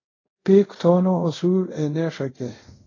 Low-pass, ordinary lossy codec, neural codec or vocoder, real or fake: 7.2 kHz; AAC, 32 kbps; codec, 24 kHz, 0.5 kbps, DualCodec; fake